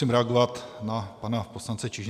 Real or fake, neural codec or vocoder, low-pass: real; none; 14.4 kHz